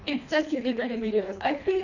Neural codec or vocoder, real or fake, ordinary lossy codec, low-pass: codec, 24 kHz, 1.5 kbps, HILCodec; fake; none; 7.2 kHz